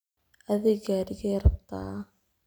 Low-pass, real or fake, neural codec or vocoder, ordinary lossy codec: none; real; none; none